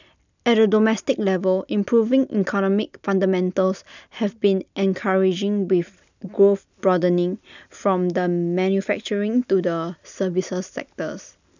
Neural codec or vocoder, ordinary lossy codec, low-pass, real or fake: none; none; 7.2 kHz; real